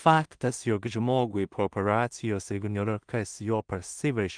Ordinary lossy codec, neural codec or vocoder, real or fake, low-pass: Opus, 32 kbps; codec, 16 kHz in and 24 kHz out, 0.4 kbps, LongCat-Audio-Codec, two codebook decoder; fake; 9.9 kHz